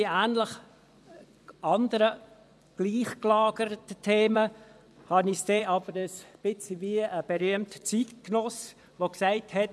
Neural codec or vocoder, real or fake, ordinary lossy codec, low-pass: vocoder, 24 kHz, 100 mel bands, Vocos; fake; none; none